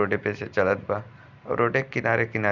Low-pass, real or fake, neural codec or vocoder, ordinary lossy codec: 7.2 kHz; real; none; none